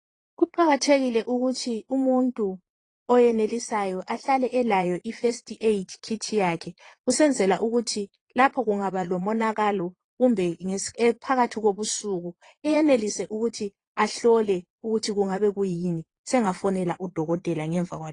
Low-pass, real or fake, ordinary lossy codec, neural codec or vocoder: 9.9 kHz; fake; AAC, 32 kbps; vocoder, 22.05 kHz, 80 mel bands, Vocos